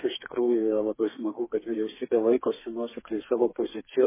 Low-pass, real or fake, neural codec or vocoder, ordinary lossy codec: 3.6 kHz; fake; codec, 32 kHz, 1.9 kbps, SNAC; MP3, 16 kbps